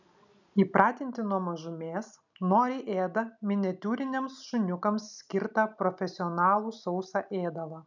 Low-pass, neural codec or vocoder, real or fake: 7.2 kHz; none; real